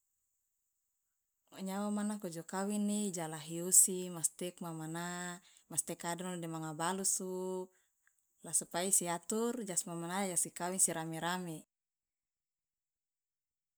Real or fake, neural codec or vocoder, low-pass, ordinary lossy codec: real; none; none; none